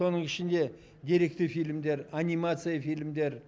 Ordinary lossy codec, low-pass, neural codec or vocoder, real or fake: none; none; none; real